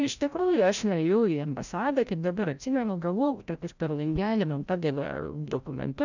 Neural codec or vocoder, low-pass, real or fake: codec, 16 kHz, 0.5 kbps, FreqCodec, larger model; 7.2 kHz; fake